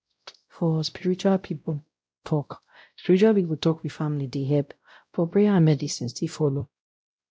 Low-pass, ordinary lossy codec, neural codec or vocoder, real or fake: none; none; codec, 16 kHz, 0.5 kbps, X-Codec, WavLM features, trained on Multilingual LibriSpeech; fake